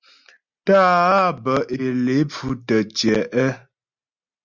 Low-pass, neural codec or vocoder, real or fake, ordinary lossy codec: 7.2 kHz; none; real; Opus, 64 kbps